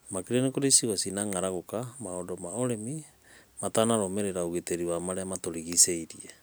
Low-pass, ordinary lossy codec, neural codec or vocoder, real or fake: none; none; none; real